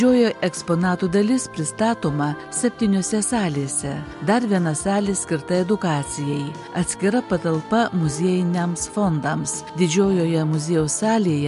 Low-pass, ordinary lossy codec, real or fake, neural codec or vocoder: 10.8 kHz; MP3, 64 kbps; real; none